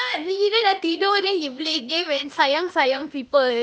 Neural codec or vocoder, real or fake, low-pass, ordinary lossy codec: codec, 16 kHz, 0.8 kbps, ZipCodec; fake; none; none